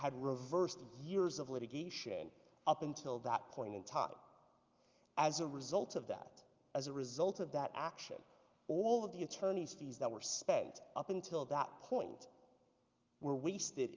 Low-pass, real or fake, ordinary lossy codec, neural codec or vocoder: 7.2 kHz; real; Opus, 32 kbps; none